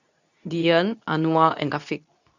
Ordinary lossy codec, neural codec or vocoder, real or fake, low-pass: none; codec, 24 kHz, 0.9 kbps, WavTokenizer, medium speech release version 2; fake; 7.2 kHz